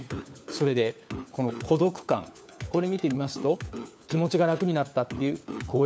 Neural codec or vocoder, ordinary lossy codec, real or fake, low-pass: codec, 16 kHz, 4 kbps, FunCodec, trained on LibriTTS, 50 frames a second; none; fake; none